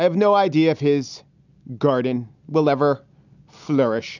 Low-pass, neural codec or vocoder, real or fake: 7.2 kHz; none; real